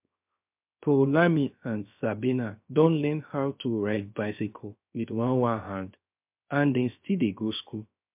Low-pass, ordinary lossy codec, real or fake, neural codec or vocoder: 3.6 kHz; MP3, 32 kbps; fake; codec, 16 kHz, 0.3 kbps, FocalCodec